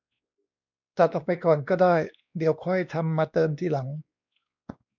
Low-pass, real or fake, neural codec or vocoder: 7.2 kHz; fake; codec, 16 kHz, 2 kbps, X-Codec, WavLM features, trained on Multilingual LibriSpeech